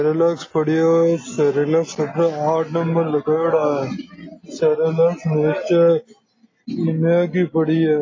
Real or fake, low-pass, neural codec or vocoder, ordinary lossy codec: real; 7.2 kHz; none; AAC, 32 kbps